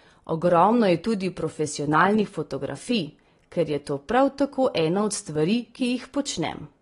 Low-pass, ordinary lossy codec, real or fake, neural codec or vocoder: 10.8 kHz; AAC, 32 kbps; real; none